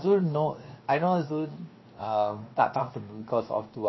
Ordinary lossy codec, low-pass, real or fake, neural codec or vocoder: MP3, 24 kbps; 7.2 kHz; fake; codec, 16 kHz, 0.7 kbps, FocalCodec